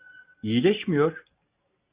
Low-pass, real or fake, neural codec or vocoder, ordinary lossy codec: 3.6 kHz; real; none; Opus, 64 kbps